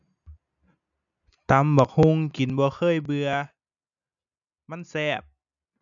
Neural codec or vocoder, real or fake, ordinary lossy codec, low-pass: none; real; none; 7.2 kHz